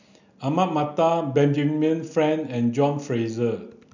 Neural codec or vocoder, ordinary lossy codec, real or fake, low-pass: none; none; real; 7.2 kHz